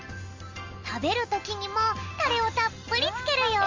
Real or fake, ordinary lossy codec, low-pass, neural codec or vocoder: real; Opus, 32 kbps; 7.2 kHz; none